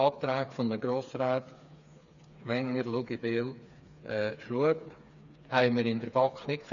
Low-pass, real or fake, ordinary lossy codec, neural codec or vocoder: 7.2 kHz; fake; none; codec, 16 kHz, 4 kbps, FreqCodec, smaller model